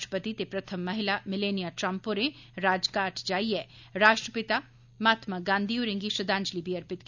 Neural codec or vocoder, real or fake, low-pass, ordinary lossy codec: none; real; 7.2 kHz; none